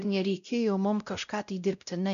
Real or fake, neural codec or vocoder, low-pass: fake; codec, 16 kHz, 0.5 kbps, X-Codec, WavLM features, trained on Multilingual LibriSpeech; 7.2 kHz